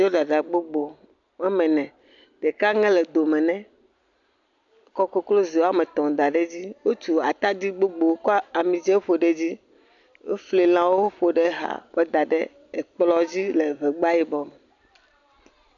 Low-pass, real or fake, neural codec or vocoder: 7.2 kHz; real; none